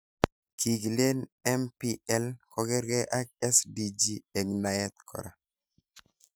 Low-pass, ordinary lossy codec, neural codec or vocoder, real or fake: none; none; none; real